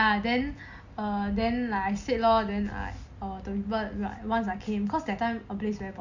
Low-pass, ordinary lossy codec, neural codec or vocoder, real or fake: 7.2 kHz; Opus, 64 kbps; none; real